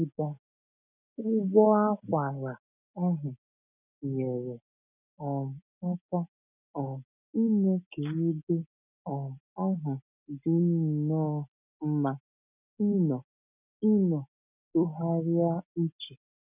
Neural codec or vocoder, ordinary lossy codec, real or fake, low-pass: none; none; real; 3.6 kHz